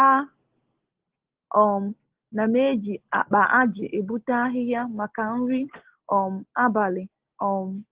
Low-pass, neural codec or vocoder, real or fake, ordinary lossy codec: 3.6 kHz; none; real; Opus, 16 kbps